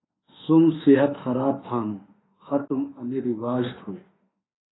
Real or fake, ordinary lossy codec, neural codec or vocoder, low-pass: fake; AAC, 16 kbps; autoencoder, 48 kHz, 32 numbers a frame, DAC-VAE, trained on Japanese speech; 7.2 kHz